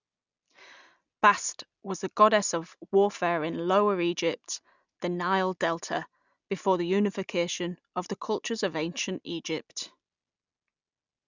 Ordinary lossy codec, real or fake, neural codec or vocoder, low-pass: none; real; none; 7.2 kHz